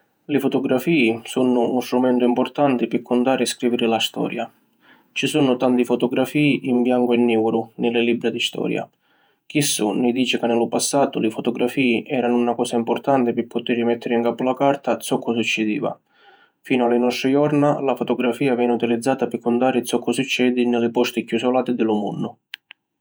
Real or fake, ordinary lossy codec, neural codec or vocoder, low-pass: fake; none; vocoder, 48 kHz, 128 mel bands, Vocos; none